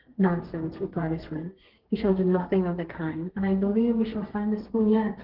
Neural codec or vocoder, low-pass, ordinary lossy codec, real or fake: codec, 32 kHz, 1.9 kbps, SNAC; 5.4 kHz; Opus, 16 kbps; fake